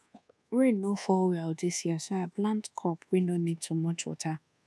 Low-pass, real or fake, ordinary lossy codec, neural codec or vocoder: none; fake; none; codec, 24 kHz, 1.2 kbps, DualCodec